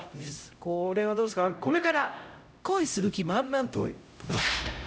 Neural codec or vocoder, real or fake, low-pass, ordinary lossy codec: codec, 16 kHz, 0.5 kbps, X-Codec, HuBERT features, trained on LibriSpeech; fake; none; none